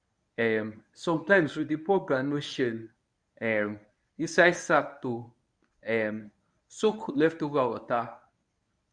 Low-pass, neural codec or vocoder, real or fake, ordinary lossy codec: 9.9 kHz; codec, 24 kHz, 0.9 kbps, WavTokenizer, medium speech release version 1; fake; none